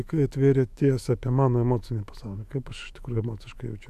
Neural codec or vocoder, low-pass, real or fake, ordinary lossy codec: autoencoder, 48 kHz, 128 numbers a frame, DAC-VAE, trained on Japanese speech; 14.4 kHz; fake; AAC, 96 kbps